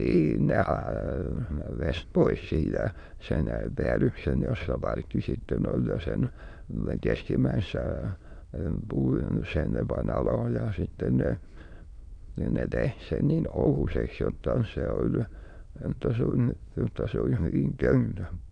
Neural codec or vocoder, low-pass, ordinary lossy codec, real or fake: autoencoder, 22.05 kHz, a latent of 192 numbers a frame, VITS, trained on many speakers; 9.9 kHz; none; fake